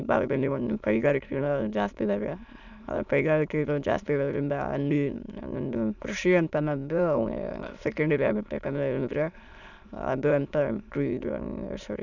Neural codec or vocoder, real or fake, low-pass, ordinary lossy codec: autoencoder, 22.05 kHz, a latent of 192 numbers a frame, VITS, trained on many speakers; fake; 7.2 kHz; none